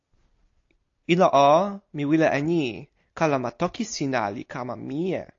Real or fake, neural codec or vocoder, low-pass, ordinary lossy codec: real; none; 7.2 kHz; AAC, 48 kbps